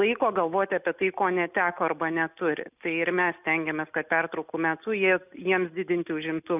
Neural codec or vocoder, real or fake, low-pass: none; real; 3.6 kHz